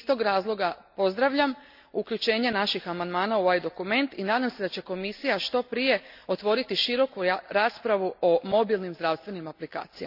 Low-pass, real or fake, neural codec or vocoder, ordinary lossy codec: 5.4 kHz; real; none; none